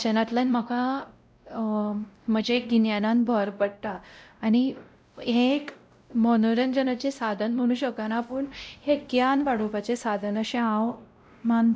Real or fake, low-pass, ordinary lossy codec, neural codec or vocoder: fake; none; none; codec, 16 kHz, 0.5 kbps, X-Codec, WavLM features, trained on Multilingual LibriSpeech